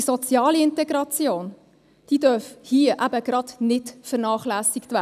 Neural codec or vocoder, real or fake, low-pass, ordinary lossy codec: none; real; 14.4 kHz; none